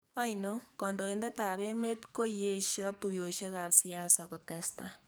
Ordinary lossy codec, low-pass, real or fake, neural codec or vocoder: none; none; fake; codec, 44.1 kHz, 2.6 kbps, SNAC